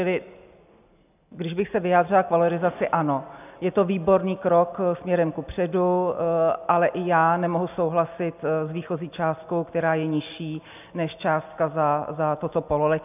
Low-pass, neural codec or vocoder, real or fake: 3.6 kHz; none; real